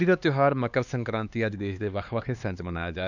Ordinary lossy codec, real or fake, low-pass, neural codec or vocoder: none; fake; 7.2 kHz; codec, 16 kHz, 2 kbps, X-Codec, HuBERT features, trained on LibriSpeech